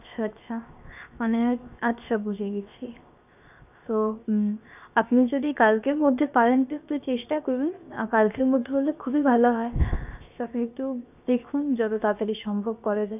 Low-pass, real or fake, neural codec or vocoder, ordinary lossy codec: 3.6 kHz; fake; codec, 16 kHz, 0.7 kbps, FocalCodec; none